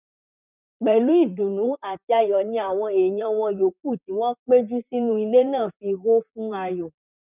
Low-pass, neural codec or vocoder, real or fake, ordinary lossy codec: 3.6 kHz; vocoder, 44.1 kHz, 128 mel bands, Pupu-Vocoder; fake; none